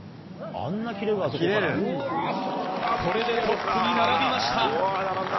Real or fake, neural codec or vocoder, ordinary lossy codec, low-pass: real; none; MP3, 24 kbps; 7.2 kHz